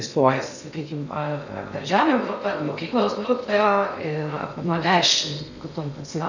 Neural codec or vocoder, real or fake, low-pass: codec, 16 kHz in and 24 kHz out, 0.6 kbps, FocalCodec, streaming, 2048 codes; fake; 7.2 kHz